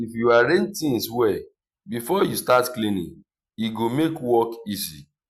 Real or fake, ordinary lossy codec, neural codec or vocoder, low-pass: real; Opus, 64 kbps; none; 14.4 kHz